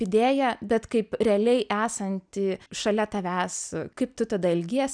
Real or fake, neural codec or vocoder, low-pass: real; none; 9.9 kHz